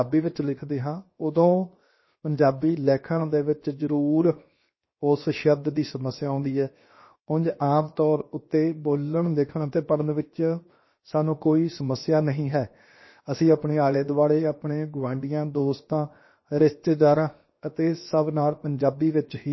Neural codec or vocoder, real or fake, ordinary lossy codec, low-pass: codec, 16 kHz, 0.7 kbps, FocalCodec; fake; MP3, 24 kbps; 7.2 kHz